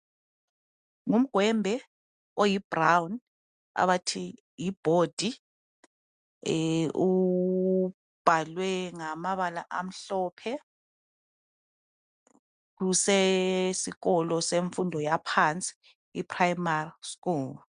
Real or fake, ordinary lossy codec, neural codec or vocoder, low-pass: real; MP3, 96 kbps; none; 9.9 kHz